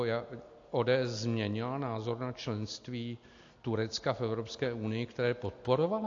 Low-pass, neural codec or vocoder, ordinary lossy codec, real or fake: 7.2 kHz; none; AAC, 48 kbps; real